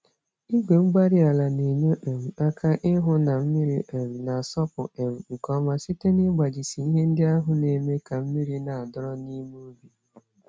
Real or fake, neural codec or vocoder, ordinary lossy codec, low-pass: real; none; none; none